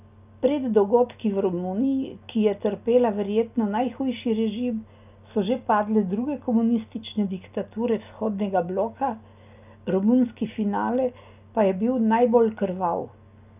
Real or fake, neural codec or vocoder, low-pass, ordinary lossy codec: real; none; 3.6 kHz; none